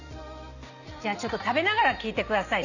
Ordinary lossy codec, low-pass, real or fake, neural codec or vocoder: none; 7.2 kHz; real; none